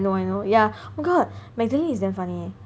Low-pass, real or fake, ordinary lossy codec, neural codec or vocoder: none; real; none; none